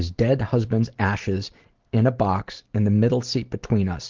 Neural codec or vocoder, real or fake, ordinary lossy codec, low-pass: none; real; Opus, 16 kbps; 7.2 kHz